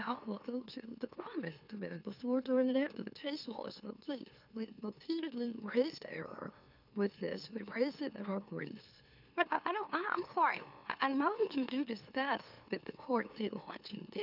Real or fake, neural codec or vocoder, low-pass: fake; autoencoder, 44.1 kHz, a latent of 192 numbers a frame, MeloTTS; 5.4 kHz